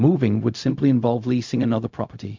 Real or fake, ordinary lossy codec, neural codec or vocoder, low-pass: fake; MP3, 64 kbps; codec, 16 kHz, 0.4 kbps, LongCat-Audio-Codec; 7.2 kHz